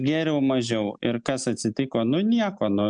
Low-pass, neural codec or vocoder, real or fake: 9.9 kHz; none; real